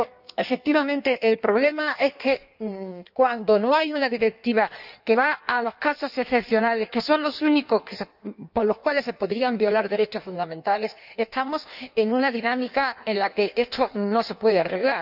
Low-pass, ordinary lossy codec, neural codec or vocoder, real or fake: 5.4 kHz; none; codec, 16 kHz in and 24 kHz out, 1.1 kbps, FireRedTTS-2 codec; fake